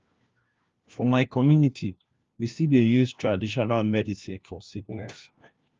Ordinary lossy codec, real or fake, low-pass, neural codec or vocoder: Opus, 32 kbps; fake; 7.2 kHz; codec, 16 kHz, 1 kbps, FunCodec, trained on LibriTTS, 50 frames a second